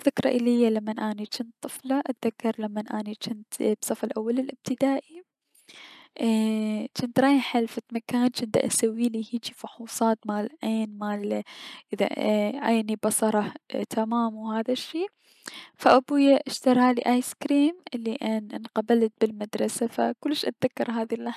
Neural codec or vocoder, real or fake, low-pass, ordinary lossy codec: none; real; 14.4 kHz; none